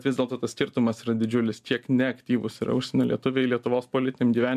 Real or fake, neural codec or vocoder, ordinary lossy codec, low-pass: real; none; MP3, 96 kbps; 14.4 kHz